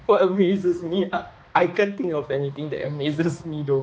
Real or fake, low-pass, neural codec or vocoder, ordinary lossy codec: fake; none; codec, 16 kHz, 2 kbps, X-Codec, HuBERT features, trained on balanced general audio; none